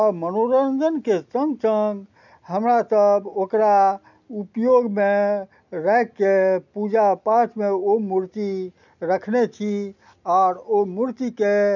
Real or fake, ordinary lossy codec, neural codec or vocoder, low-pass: real; none; none; 7.2 kHz